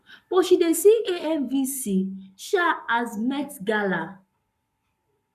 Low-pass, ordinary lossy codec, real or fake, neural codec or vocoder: 14.4 kHz; none; fake; codec, 44.1 kHz, 7.8 kbps, Pupu-Codec